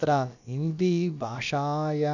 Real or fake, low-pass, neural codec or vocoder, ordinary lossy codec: fake; 7.2 kHz; codec, 16 kHz, about 1 kbps, DyCAST, with the encoder's durations; none